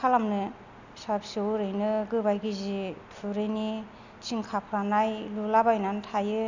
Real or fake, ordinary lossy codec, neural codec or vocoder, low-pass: real; none; none; 7.2 kHz